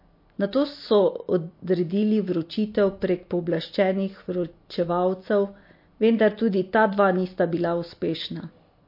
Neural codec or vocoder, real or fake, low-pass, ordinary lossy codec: none; real; 5.4 kHz; MP3, 32 kbps